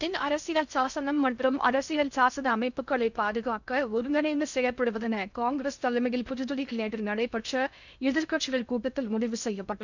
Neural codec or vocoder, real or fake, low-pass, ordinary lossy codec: codec, 16 kHz in and 24 kHz out, 0.6 kbps, FocalCodec, streaming, 2048 codes; fake; 7.2 kHz; none